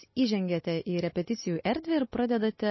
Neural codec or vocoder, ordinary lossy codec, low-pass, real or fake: none; MP3, 24 kbps; 7.2 kHz; real